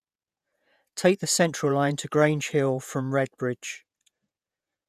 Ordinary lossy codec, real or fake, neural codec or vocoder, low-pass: none; fake; vocoder, 48 kHz, 128 mel bands, Vocos; 14.4 kHz